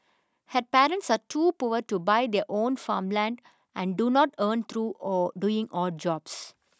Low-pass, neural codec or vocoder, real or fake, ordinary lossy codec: none; none; real; none